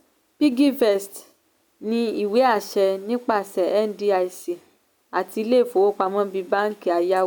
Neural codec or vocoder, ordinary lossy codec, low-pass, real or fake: none; none; none; real